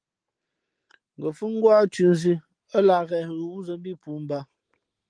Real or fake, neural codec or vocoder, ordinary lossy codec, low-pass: real; none; Opus, 24 kbps; 9.9 kHz